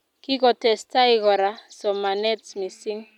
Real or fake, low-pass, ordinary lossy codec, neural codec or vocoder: real; 19.8 kHz; none; none